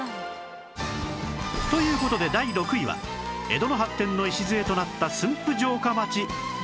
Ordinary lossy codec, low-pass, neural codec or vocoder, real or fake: none; none; none; real